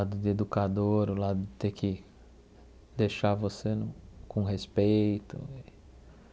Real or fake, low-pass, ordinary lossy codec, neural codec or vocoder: real; none; none; none